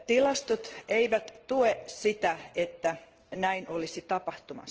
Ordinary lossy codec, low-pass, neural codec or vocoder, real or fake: Opus, 16 kbps; 7.2 kHz; none; real